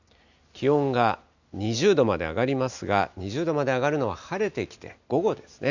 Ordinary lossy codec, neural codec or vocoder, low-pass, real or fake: none; none; 7.2 kHz; real